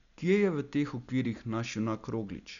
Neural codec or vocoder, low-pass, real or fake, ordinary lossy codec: none; 7.2 kHz; real; none